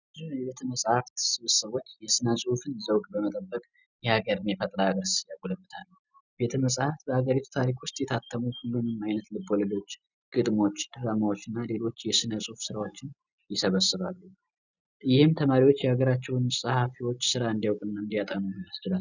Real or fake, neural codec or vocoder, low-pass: real; none; 7.2 kHz